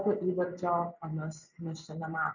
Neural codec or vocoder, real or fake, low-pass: none; real; 7.2 kHz